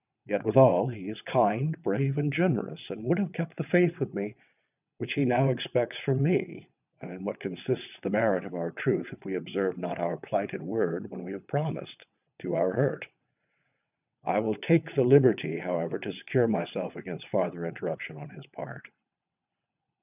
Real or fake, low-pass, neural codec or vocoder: fake; 3.6 kHz; vocoder, 22.05 kHz, 80 mel bands, WaveNeXt